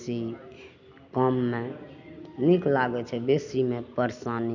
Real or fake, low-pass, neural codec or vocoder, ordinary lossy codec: real; 7.2 kHz; none; none